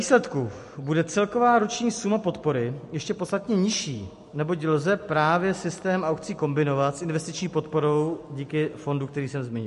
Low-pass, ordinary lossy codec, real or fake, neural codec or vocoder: 14.4 kHz; MP3, 48 kbps; real; none